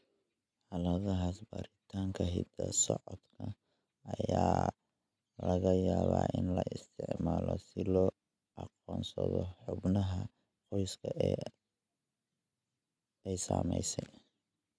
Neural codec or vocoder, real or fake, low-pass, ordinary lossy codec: none; real; none; none